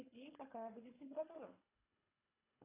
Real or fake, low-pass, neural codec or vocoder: fake; 3.6 kHz; codec, 24 kHz, 3 kbps, HILCodec